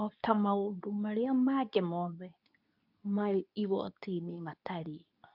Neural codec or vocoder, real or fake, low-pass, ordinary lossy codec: codec, 24 kHz, 0.9 kbps, WavTokenizer, medium speech release version 2; fake; 5.4 kHz; none